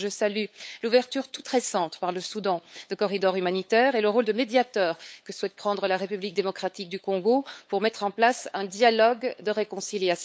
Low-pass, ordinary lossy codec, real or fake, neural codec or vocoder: none; none; fake; codec, 16 kHz, 8 kbps, FunCodec, trained on LibriTTS, 25 frames a second